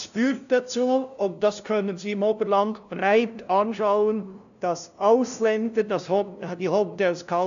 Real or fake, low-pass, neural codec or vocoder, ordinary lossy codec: fake; 7.2 kHz; codec, 16 kHz, 0.5 kbps, FunCodec, trained on LibriTTS, 25 frames a second; none